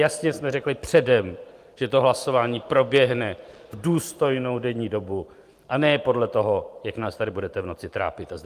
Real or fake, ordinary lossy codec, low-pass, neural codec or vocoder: fake; Opus, 32 kbps; 14.4 kHz; vocoder, 44.1 kHz, 128 mel bands every 512 samples, BigVGAN v2